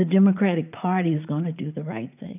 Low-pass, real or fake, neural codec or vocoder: 3.6 kHz; fake; vocoder, 44.1 kHz, 80 mel bands, Vocos